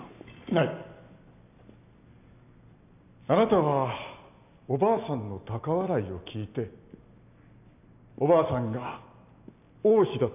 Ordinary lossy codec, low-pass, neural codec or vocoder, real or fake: none; 3.6 kHz; none; real